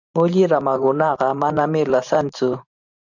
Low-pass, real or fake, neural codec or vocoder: 7.2 kHz; fake; vocoder, 44.1 kHz, 128 mel bands every 512 samples, BigVGAN v2